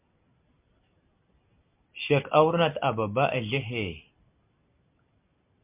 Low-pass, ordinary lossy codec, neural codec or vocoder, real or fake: 3.6 kHz; MP3, 32 kbps; none; real